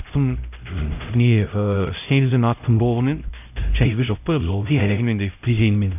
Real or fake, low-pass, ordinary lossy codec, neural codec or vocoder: fake; 3.6 kHz; none; codec, 16 kHz, 0.5 kbps, X-Codec, HuBERT features, trained on LibriSpeech